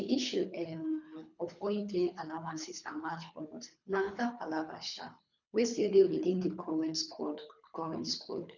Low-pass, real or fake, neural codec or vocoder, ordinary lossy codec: 7.2 kHz; fake; codec, 24 kHz, 3 kbps, HILCodec; none